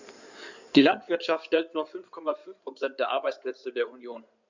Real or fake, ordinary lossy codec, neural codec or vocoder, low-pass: fake; none; codec, 16 kHz in and 24 kHz out, 2.2 kbps, FireRedTTS-2 codec; 7.2 kHz